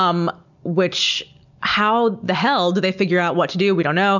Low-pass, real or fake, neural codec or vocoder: 7.2 kHz; real; none